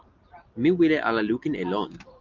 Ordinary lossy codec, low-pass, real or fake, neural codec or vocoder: Opus, 24 kbps; 7.2 kHz; real; none